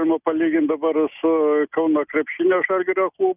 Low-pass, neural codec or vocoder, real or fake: 3.6 kHz; none; real